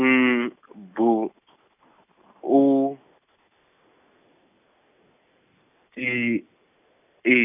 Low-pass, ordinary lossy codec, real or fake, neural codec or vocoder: 3.6 kHz; none; real; none